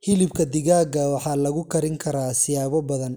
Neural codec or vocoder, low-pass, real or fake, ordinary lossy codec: none; none; real; none